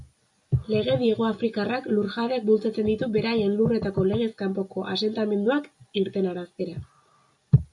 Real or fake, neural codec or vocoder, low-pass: real; none; 10.8 kHz